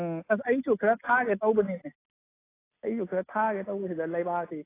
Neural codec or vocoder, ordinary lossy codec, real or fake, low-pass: none; AAC, 16 kbps; real; 3.6 kHz